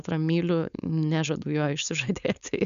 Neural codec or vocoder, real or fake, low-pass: codec, 16 kHz, 4.8 kbps, FACodec; fake; 7.2 kHz